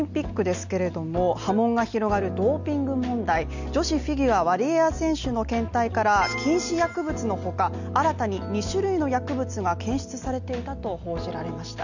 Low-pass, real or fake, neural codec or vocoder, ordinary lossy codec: 7.2 kHz; real; none; none